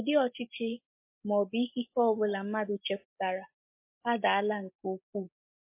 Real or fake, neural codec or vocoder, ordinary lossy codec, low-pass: real; none; MP3, 24 kbps; 3.6 kHz